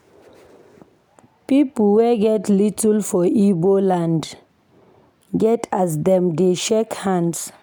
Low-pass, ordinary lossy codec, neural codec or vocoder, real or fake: none; none; none; real